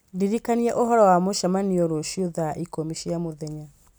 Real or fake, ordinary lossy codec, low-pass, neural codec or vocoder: real; none; none; none